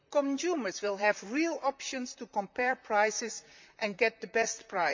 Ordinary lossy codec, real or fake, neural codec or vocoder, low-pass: MP3, 64 kbps; fake; vocoder, 44.1 kHz, 128 mel bands, Pupu-Vocoder; 7.2 kHz